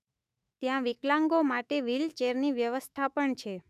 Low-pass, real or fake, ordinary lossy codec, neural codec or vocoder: 14.4 kHz; fake; none; autoencoder, 48 kHz, 128 numbers a frame, DAC-VAE, trained on Japanese speech